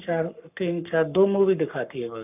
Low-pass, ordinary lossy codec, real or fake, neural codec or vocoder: 3.6 kHz; none; fake; codec, 44.1 kHz, 7.8 kbps, Pupu-Codec